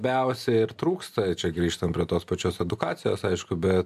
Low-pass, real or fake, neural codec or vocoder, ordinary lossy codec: 14.4 kHz; real; none; AAC, 96 kbps